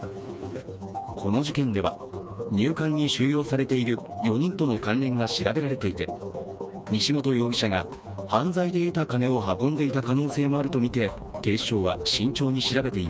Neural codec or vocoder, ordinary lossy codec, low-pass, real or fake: codec, 16 kHz, 2 kbps, FreqCodec, smaller model; none; none; fake